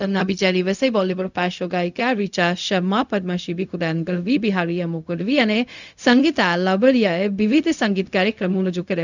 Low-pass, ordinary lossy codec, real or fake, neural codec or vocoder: 7.2 kHz; none; fake; codec, 16 kHz, 0.4 kbps, LongCat-Audio-Codec